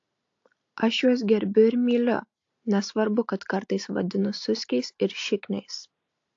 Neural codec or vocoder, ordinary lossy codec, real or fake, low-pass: none; AAC, 48 kbps; real; 7.2 kHz